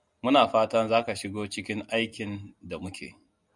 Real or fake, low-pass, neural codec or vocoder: real; 10.8 kHz; none